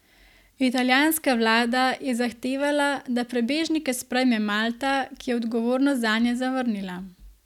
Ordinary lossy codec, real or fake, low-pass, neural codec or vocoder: none; real; 19.8 kHz; none